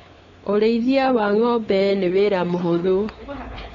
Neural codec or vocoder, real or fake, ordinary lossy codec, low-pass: codec, 16 kHz, 4 kbps, X-Codec, WavLM features, trained on Multilingual LibriSpeech; fake; AAC, 32 kbps; 7.2 kHz